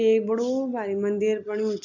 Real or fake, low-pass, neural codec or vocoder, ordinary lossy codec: real; 7.2 kHz; none; none